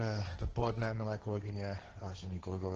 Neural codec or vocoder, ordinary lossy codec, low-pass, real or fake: codec, 16 kHz, 1.1 kbps, Voila-Tokenizer; Opus, 16 kbps; 7.2 kHz; fake